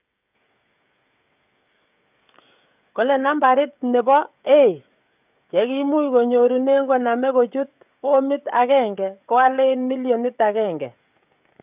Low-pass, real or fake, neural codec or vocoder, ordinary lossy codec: 3.6 kHz; fake; codec, 16 kHz, 16 kbps, FreqCodec, smaller model; none